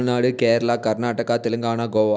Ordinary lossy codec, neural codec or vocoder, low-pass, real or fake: none; none; none; real